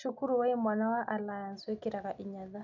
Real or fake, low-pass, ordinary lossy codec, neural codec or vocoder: real; 7.2 kHz; none; none